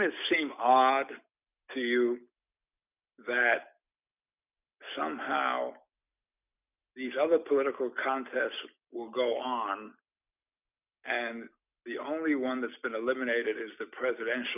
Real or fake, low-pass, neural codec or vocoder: real; 3.6 kHz; none